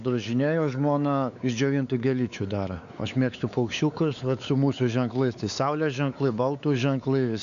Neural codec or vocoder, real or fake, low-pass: codec, 16 kHz, 4 kbps, X-Codec, WavLM features, trained on Multilingual LibriSpeech; fake; 7.2 kHz